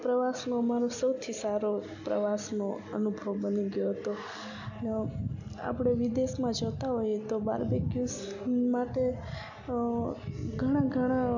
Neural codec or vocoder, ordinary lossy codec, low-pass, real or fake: none; none; 7.2 kHz; real